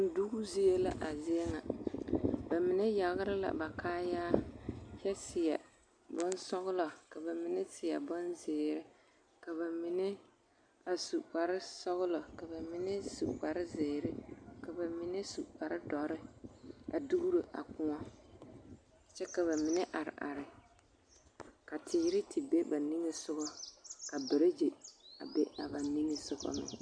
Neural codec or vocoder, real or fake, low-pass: none; real; 9.9 kHz